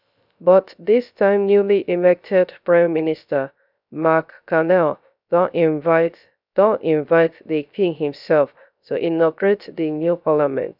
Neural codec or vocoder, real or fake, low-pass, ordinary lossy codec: codec, 16 kHz, 0.3 kbps, FocalCodec; fake; 5.4 kHz; none